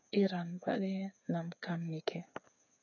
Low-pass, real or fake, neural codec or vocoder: 7.2 kHz; fake; codec, 16 kHz, 8 kbps, FreqCodec, smaller model